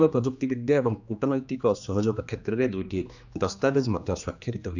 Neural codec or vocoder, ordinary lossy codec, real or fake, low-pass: codec, 16 kHz, 2 kbps, X-Codec, HuBERT features, trained on general audio; none; fake; 7.2 kHz